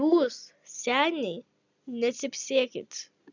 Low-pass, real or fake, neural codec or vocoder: 7.2 kHz; real; none